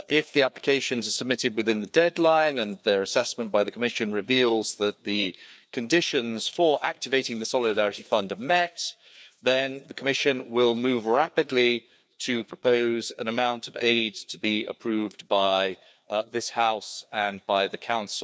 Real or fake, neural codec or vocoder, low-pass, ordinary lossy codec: fake; codec, 16 kHz, 2 kbps, FreqCodec, larger model; none; none